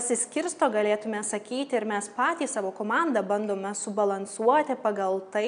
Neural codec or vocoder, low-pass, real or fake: none; 9.9 kHz; real